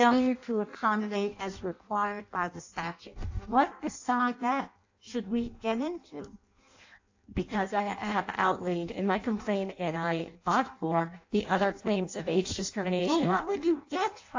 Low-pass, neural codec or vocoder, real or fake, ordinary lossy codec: 7.2 kHz; codec, 16 kHz in and 24 kHz out, 0.6 kbps, FireRedTTS-2 codec; fake; AAC, 48 kbps